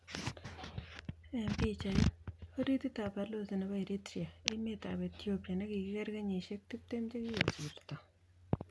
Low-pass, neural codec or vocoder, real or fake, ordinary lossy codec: none; none; real; none